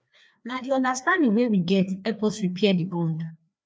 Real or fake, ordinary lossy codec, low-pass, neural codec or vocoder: fake; none; none; codec, 16 kHz, 2 kbps, FreqCodec, larger model